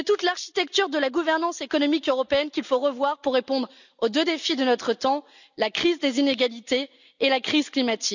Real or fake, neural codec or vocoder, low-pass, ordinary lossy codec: real; none; 7.2 kHz; none